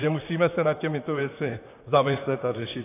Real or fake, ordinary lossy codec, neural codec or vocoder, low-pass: fake; AAC, 16 kbps; vocoder, 44.1 kHz, 128 mel bands, Pupu-Vocoder; 3.6 kHz